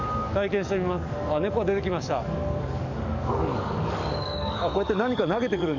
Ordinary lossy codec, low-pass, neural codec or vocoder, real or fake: none; 7.2 kHz; codec, 44.1 kHz, 7.8 kbps, DAC; fake